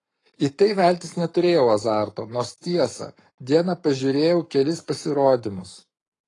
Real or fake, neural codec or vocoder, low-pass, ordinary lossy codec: fake; codec, 44.1 kHz, 7.8 kbps, Pupu-Codec; 10.8 kHz; AAC, 32 kbps